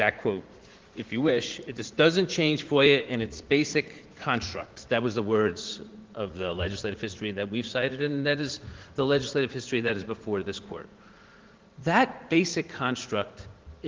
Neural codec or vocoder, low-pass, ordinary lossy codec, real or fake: vocoder, 44.1 kHz, 80 mel bands, Vocos; 7.2 kHz; Opus, 16 kbps; fake